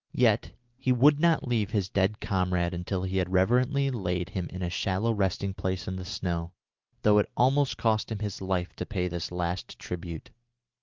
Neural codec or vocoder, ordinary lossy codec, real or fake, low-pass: none; Opus, 32 kbps; real; 7.2 kHz